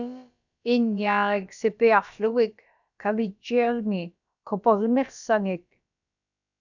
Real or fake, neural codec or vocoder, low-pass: fake; codec, 16 kHz, about 1 kbps, DyCAST, with the encoder's durations; 7.2 kHz